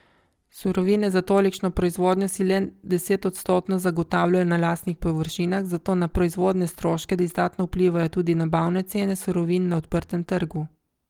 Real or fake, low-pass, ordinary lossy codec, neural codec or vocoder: real; 19.8 kHz; Opus, 24 kbps; none